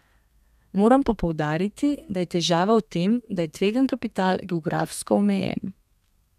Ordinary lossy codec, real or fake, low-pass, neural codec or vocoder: none; fake; 14.4 kHz; codec, 32 kHz, 1.9 kbps, SNAC